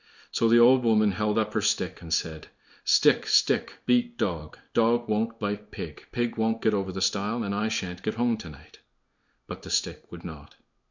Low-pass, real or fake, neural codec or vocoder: 7.2 kHz; fake; codec, 16 kHz in and 24 kHz out, 1 kbps, XY-Tokenizer